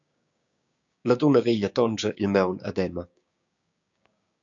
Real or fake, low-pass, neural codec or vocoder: fake; 7.2 kHz; codec, 16 kHz, 6 kbps, DAC